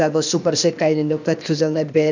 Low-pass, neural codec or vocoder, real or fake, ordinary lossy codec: 7.2 kHz; codec, 16 kHz, 0.8 kbps, ZipCodec; fake; none